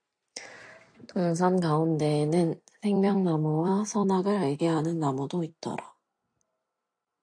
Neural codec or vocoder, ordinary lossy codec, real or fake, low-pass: vocoder, 44.1 kHz, 128 mel bands every 256 samples, BigVGAN v2; AAC, 48 kbps; fake; 9.9 kHz